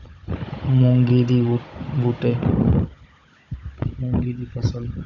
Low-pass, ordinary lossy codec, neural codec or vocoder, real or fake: 7.2 kHz; none; codec, 16 kHz, 16 kbps, FunCodec, trained on Chinese and English, 50 frames a second; fake